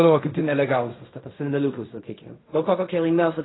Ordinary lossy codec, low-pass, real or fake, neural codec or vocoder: AAC, 16 kbps; 7.2 kHz; fake; codec, 16 kHz in and 24 kHz out, 0.4 kbps, LongCat-Audio-Codec, fine tuned four codebook decoder